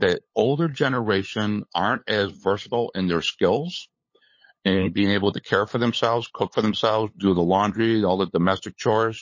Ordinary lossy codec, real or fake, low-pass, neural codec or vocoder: MP3, 32 kbps; fake; 7.2 kHz; codec, 16 kHz, 8 kbps, FunCodec, trained on LibriTTS, 25 frames a second